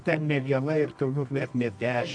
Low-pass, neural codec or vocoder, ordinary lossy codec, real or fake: 9.9 kHz; codec, 24 kHz, 0.9 kbps, WavTokenizer, medium music audio release; MP3, 64 kbps; fake